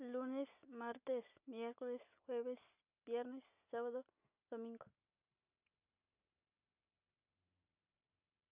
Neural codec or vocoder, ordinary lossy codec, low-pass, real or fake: none; none; 3.6 kHz; real